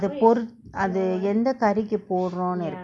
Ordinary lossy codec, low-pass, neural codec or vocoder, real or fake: none; none; none; real